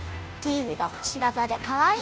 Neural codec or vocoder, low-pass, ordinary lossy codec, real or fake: codec, 16 kHz, 0.5 kbps, FunCodec, trained on Chinese and English, 25 frames a second; none; none; fake